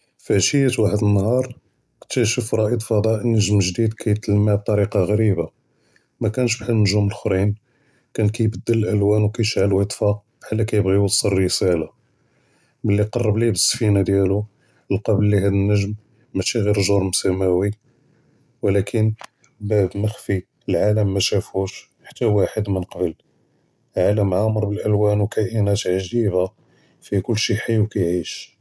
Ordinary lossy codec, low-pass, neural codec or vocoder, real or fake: none; none; none; real